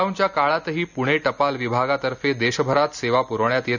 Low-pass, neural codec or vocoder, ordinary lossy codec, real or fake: 7.2 kHz; none; none; real